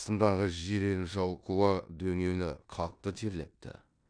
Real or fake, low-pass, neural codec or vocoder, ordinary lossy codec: fake; 9.9 kHz; codec, 16 kHz in and 24 kHz out, 0.9 kbps, LongCat-Audio-Codec, four codebook decoder; none